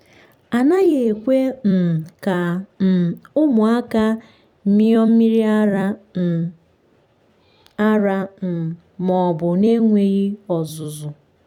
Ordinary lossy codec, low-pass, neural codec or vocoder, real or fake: none; 19.8 kHz; vocoder, 44.1 kHz, 128 mel bands every 256 samples, BigVGAN v2; fake